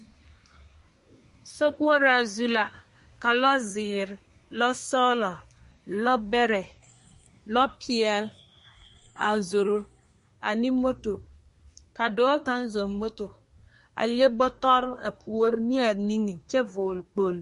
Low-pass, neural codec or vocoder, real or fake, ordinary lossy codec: 10.8 kHz; codec, 24 kHz, 1 kbps, SNAC; fake; MP3, 48 kbps